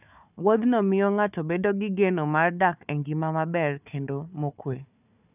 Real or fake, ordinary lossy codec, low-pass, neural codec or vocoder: fake; none; 3.6 kHz; codec, 16 kHz, 4 kbps, FunCodec, trained on Chinese and English, 50 frames a second